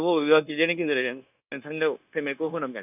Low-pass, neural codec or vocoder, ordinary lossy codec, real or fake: 3.6 kHz; codec, 16 kHz, 0.9 kbps, LongCat-Audio-Codec; none; fake